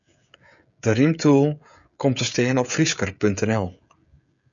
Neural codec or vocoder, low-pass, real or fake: codec, 16 kHz, 16 kbps, FreqCodec, smaller model; 7.2 kHz; fake